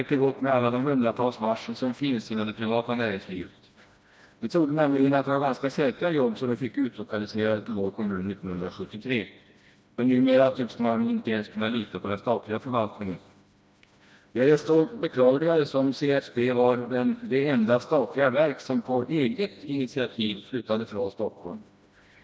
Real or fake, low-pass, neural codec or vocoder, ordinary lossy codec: fake; none; codec, 16 kHz, 1 kbps, FreqCodec, smaller model; none